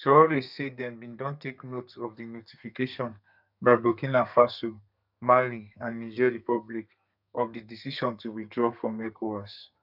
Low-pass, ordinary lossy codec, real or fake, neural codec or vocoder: 5.4 kHz; none; fake; codec, 32 kHz, 1.9 kbps, SNAC